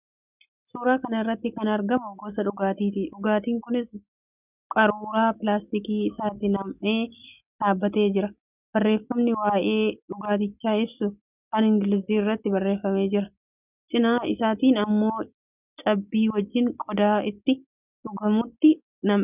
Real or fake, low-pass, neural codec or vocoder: real; 3.6 kHz; none